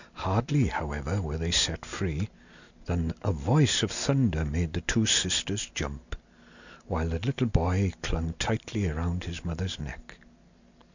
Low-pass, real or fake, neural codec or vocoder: 7.2 kHz; real; none